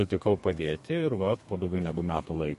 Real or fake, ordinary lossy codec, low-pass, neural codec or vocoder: fake; MP3, 48 kbps; 14.4 kHz; codec, 32 kHz, 1.9 kbps, SNAC